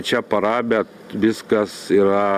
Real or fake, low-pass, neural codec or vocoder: real; 14.4 kHz; none